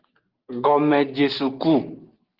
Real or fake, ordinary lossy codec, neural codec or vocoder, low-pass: real; Opus, 16 kbps; none; 5.4 kHz